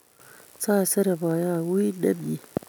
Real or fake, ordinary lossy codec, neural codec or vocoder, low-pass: real; none; none; none